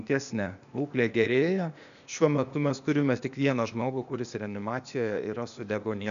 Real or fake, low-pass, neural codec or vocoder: fake; 7.2 kHz; codec, 16 kHz, 0.8 kbps, ZipCodec